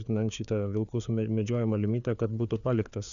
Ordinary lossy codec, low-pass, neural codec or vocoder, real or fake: MP3, 96 kbps; 7.2 kHz; codec, 16 kHz, 4 kbps, FreqCodec, larger model; fake